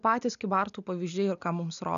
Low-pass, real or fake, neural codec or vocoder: 7.2 kHz; real; none